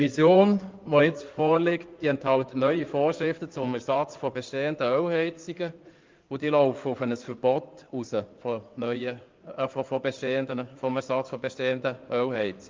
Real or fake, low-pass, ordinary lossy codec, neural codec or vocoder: fake; 7.2 kHz; Opus, 24 kbps; codec, 16 kHz in and 24 kHz out, 2.2 kbps, FireRedTTS-2 codec